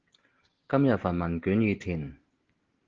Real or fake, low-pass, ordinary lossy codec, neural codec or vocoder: real; 7.2 kHz; Opus, 16 kbps; none